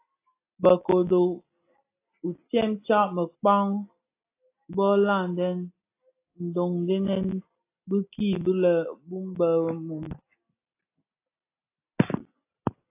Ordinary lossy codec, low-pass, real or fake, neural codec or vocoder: AAC, 24 kbps; 3.6 kHz; real; none